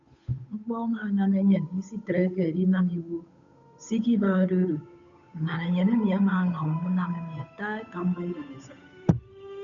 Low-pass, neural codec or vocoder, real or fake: 7.2 kHz; codec, 16 kHz, 8 kbps, FunCodec, trained on Chinese and English, 25 frames a second; fake